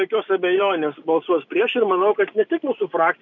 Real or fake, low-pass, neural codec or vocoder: real; 7.2 kHz; none